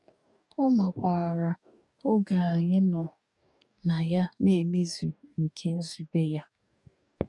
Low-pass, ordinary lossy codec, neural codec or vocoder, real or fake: 10.8 kHz; none; codec, 44.1 kHz, 2.6 kbps, DAC; fake